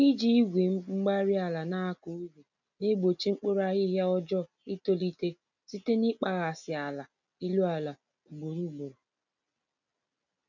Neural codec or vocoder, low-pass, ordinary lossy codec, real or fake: none; 7.2 kHz; none; real